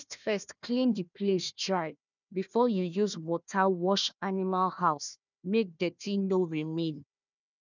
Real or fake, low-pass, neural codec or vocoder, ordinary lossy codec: fake; 7.2 kHz; codec, 16 kHz, 1 kbps, FunCodec, trained on Chinese and English, 50 frames a second; none